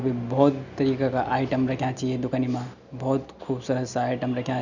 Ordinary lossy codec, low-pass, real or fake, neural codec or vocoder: none; 7.2 kHz; real; none